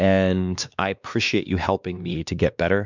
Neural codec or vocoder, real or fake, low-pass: codec, 16 kHz, 2 kbps, X-Codec, WavLM features, trained on Multilingual LibriSpeech; fake; 7.2 kHz